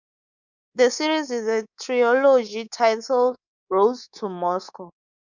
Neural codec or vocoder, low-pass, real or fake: codec, 24 kHz, 3.1 kbps, DualCodec; 7.2 kHz; fake